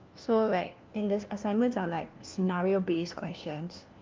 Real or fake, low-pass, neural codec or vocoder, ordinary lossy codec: fake; 7.2 kHz; codec, 16 kHz, 1 kbps, FunCodec, trained on LibriTTS, 50 frames a second; Opus, 24 kbps